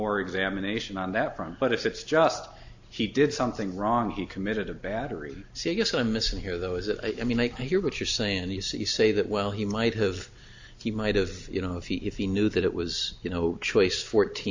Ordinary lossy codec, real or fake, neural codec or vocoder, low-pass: AAC, 48 kbps; real; none; 7.2 kHz